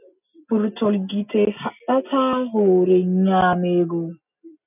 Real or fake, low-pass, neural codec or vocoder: real; 3.6 kHz; none